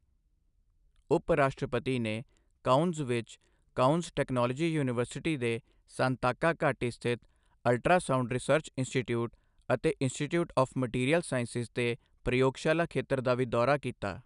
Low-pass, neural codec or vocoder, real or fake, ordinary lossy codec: 10.8 kHz; none; real; none